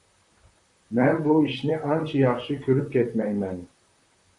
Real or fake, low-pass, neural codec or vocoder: fake; 10.8 kHz; vocoder, 44.1 kHz, 128 mel bands, Pupu-Vocoder